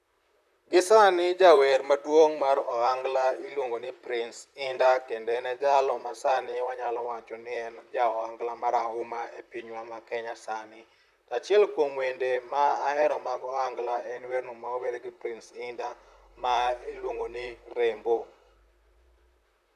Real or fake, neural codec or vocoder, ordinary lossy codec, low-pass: fake; vocoder, 44.1 kHz, 128 mel bands, Pupu-Vocoder; none; 14.4 kHz